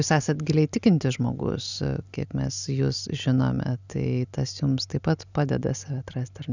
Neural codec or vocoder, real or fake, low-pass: none; real; 7.2 kHz